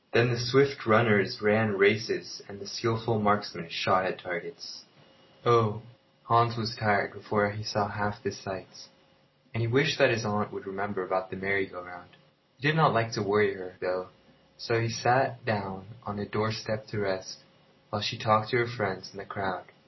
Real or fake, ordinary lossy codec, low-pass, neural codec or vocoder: real; MP3, 24 kbps; 7.2 kHz; none